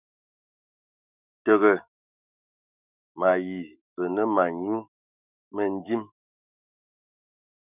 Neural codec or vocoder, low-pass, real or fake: autoencoder, 48 kHz, 128 numbers a frame, DAC-VAE, trained on Japanese speech; 3.6 kHz; fake